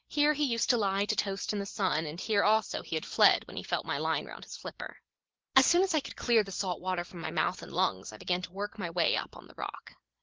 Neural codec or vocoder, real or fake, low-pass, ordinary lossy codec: none; real; 7.2 kHz; Opus, 16 kbps